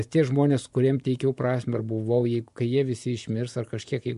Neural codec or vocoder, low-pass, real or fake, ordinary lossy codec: vocoder, 24 kHz, 100 mel bands, Vocos; 10.8 kHz; fake; MP3, 64 kbps